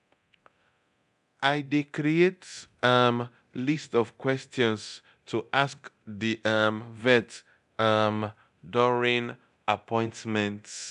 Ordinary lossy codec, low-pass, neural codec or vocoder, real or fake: none; 10.8 kHz; codec, 24 kHz, 0.9 kbps, DualCodec; fake